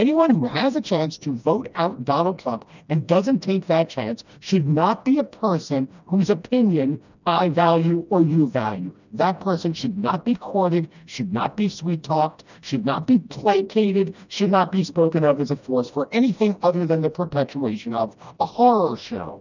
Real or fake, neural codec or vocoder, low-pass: fake; codec, 16 kHz, 1 kbps, FreqCodec, smaller model; 7.2 kHz